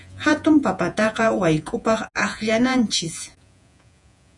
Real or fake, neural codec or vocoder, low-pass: fake; vocoder, 48 kHz, 128 mel bands, Vocos; 10.8 kHz